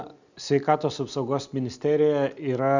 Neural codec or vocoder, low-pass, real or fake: none; 7.2 kHz; real